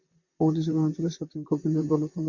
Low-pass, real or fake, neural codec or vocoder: 7.2 kHz; fake; vocoder, 44.1 kHz, 128 mel bands, Pupu-Vocoder